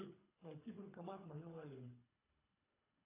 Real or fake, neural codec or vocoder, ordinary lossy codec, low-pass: fake; codec, 24 kHz, 3 kbps, HILCodec; AAC, 24 kbps; 3.6 kHz